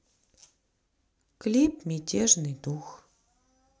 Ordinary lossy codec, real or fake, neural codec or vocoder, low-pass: none; real; none; none